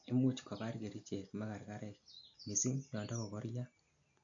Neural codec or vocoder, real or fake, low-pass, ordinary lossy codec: none; real; 7.2 kHz; AAC, 64 kbps